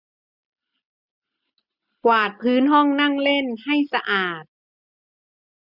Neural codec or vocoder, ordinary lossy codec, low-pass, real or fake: none; none; 5.4 kHz; real